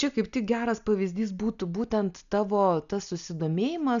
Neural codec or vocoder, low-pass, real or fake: none; 7.2 kHz; real